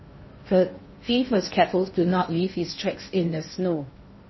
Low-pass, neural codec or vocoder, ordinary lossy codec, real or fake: 7.2 kHz; codec, 16 kHz in and 24 kHz out, 0.6 kbps, FocalCodec, streaming, 4096 codes; MP3, 24 kbps; fake